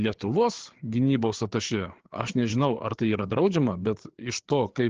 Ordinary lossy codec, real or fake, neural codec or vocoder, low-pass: Opus, 16 kbps; fake; codec, 16 kHz, 4 kbps, FreqCodec, larger model; 7.2 kHz